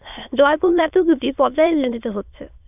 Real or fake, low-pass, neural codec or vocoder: fake; 3.6 kHz; autoencoder, 22.05 kHz, a latent of 192 numbers a frame, VITS, trained on many speakers